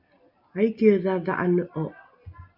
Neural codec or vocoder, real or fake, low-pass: none; real; 5.4 kHz